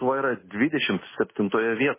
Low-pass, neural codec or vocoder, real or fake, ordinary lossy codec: 3.6 kHz; none; real; MP3, 16 kbps